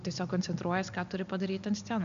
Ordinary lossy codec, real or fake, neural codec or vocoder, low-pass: AAC, 96 kbps; real; none; 7.2 kHz